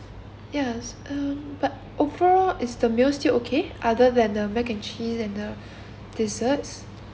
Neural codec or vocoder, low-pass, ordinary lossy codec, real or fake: none; none; none; real